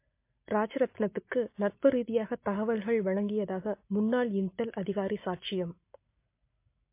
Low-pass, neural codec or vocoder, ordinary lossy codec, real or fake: 3.6 kHz; none; MP3, 24 kbps; real